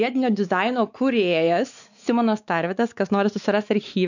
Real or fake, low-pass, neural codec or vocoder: fake; 7.2 kHz; codec, 16 kHz, 4 kbps, X-Codec, WavLM features, trained on Multilingual LibriSpeech